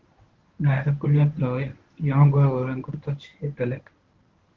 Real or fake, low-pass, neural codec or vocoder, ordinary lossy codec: fake; 7.2 kHz; codec, 24 kHz, 0.9 kbps, WavTokenizer, medium speech release version 1; Opus, 16 kbps